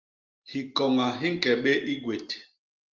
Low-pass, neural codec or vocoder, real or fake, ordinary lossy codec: 7.2 kHz; none; real; Opus, 24 kbps